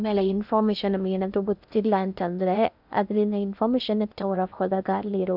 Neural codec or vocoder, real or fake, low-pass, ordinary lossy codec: codec, 16 kHz in and 24 kHz out, 0.6 kbps, FocalCodec, streaming, 2048 codes; fake; 5.4 kHz; none